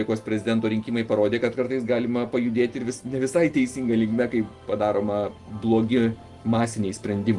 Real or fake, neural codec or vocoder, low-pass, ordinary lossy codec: real; none; 10.8 kHz; Opus, 24 kbps